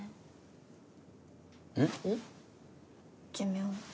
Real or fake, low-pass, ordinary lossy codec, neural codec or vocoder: real; none; none; none